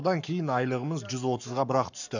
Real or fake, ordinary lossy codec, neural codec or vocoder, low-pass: fake; none; codec, 44.1 kHz, 7.8 kbps, Pupu-Codec; 7.2 kHz